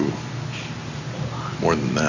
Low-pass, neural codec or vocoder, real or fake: 7.2 kHz; none; real